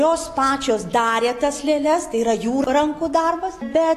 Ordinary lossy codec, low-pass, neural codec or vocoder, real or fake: AAC, 48 kbps; 14.4 kHz; none; real